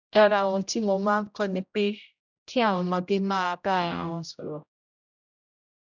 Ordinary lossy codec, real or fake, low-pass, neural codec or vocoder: none; fake; 7.2 kHz; codec, 16 kHz, 0.5 kbps, X-Codec, HuBERT features, trained on general audio